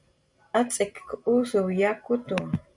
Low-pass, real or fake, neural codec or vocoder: 10.8 kHz; real; none